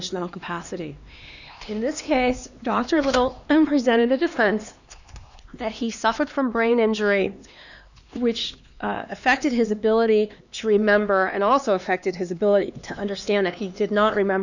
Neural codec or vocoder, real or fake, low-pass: codec, 16 kHz, 2 kbps, X-Codec, HuBERT features, trained on LibriSpeech; fake; 7.2 kHz